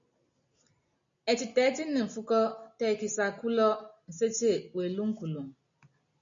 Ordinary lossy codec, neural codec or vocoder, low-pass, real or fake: MP3, 64 kbps; none; 7.2 kHz; real